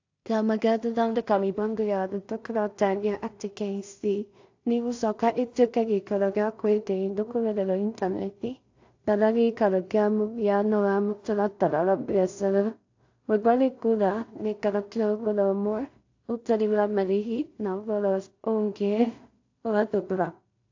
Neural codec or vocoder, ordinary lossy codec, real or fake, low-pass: codec, 16 kHz in and 24 kHz out, 0.4 kbps, LongCat-Audio-Codec, two codebook decoder; AAC, 48 kbps; fake; 7.2 kHz